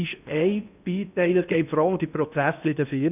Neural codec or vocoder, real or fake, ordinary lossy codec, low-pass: codec, 16 kHz in and 24 kHz out, 0.6 kbps, FocalCodec, streaming, 4096 codes; fake; none; 3.6 kHz